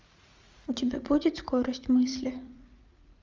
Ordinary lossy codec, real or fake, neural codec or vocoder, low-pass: Opus, 32 kbps; fake; vocoder, 44.1 kHz, 80 mel bands, Vocos; 7.2 kHz